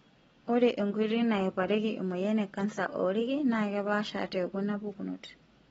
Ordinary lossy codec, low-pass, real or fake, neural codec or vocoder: AAC, 24 kbps; 19.8 kHz; real; none